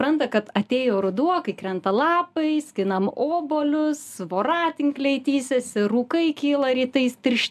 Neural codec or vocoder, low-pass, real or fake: none; 14.4 kHz; real